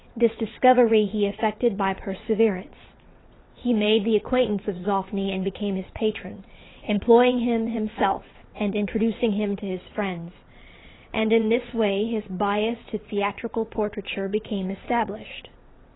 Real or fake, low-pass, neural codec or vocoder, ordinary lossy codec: fake; 7.2 kHz; vocoder, 22.05 kHz, 80 mel bands, WaveNeXt; AAC, 16 kbps